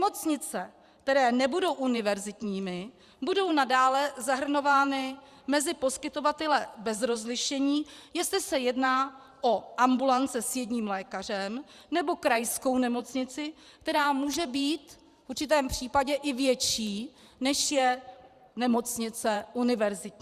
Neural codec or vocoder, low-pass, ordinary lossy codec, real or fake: vocoder, 44.1 kHz, 128 mel bands every 512 samples, BigVGAN v2; 14.4 kHz; Opus, 64 kbps; fake